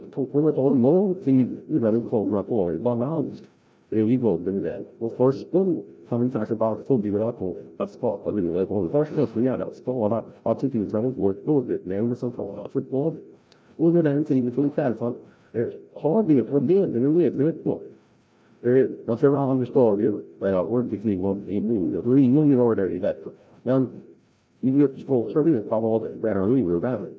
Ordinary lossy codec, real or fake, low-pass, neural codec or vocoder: none; fake; none; codec, 16 kHz, 0.5 kbps, FreqCodec, larger model